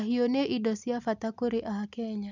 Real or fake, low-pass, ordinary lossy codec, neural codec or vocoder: real; 7.2 kHz; none; none